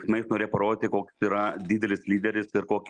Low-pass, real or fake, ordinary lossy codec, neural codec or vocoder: 9.9 kHz; real; Opus, 32 kbps; none